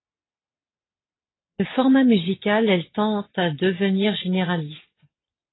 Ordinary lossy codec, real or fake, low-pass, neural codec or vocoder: AAC, 16 kbps; real; 7.2 kHz; none